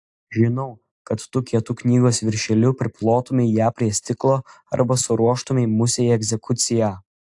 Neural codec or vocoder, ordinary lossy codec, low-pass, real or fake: none; AAC, 64 kbps; 10.8 kHz; real